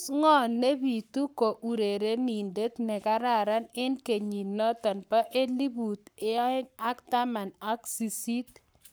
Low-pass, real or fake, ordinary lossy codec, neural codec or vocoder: none; fake; none; codec, 44.1 kHz, 7.8 kbps, Pupu-Codec